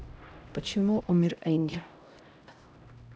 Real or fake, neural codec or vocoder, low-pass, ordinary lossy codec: fake; codec, 16 kHz, 0.5 kbps, X-Codec, HuBERT features, trained on LibriSpeech; none; none